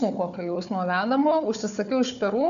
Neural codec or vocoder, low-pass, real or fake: codec, 16 kHz, 4 kbps, FunCodec, trained on Chinese and English, 50 frames a second; 7.2 kHz; fake